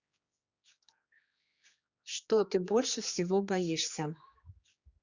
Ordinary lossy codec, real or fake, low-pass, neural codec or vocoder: Opus, 64 kbps; fake; 7.2 kHz; codec, 16 kHz, 2 kbps, X-Codec, HuBERT features, trained on general audio